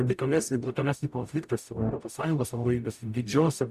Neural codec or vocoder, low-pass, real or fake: codec, 44.1 kHz, 0.9 kbps, DAC; 14.4 kHz; fake